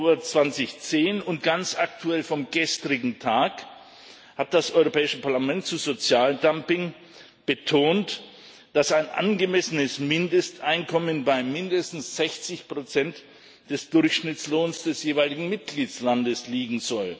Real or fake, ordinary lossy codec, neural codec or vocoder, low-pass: real; none; none; none